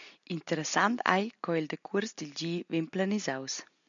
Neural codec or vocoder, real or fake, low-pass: none; real; 7.2 kHz